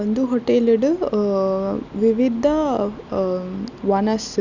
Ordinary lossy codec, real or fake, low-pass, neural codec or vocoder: none; real; 7.2 kHz; none